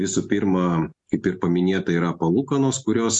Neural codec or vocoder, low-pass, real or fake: none; 10.8 kHz; real